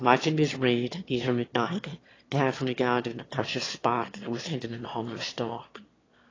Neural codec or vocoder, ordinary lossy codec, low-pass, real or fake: autoencoder, 22.05 kHz, a latent of 192 numbers a frame, VITS, trained on one speaker; AAC, 32 kbps; 7.2 kHz; fake